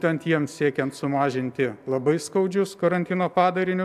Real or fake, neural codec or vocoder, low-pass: real; none; 14.4 kHz